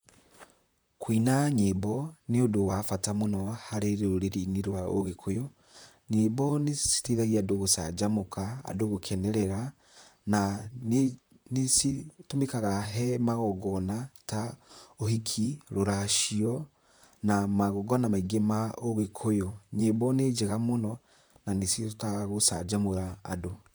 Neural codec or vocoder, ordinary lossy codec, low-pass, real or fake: vocoder, 44.1 kHz, 128 mel bands, Pupu-Vocoder; none; none; fake